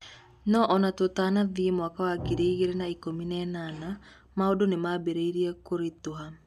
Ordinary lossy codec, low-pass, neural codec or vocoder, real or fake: none; 14.4 kHz; none; real